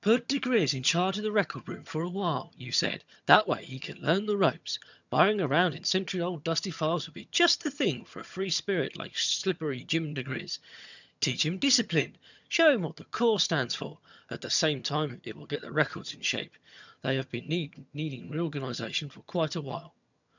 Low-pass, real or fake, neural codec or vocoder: 7.2 kHz; fake; vocoder, 22.05 kHz, 80 mel bands, HiFi-GAN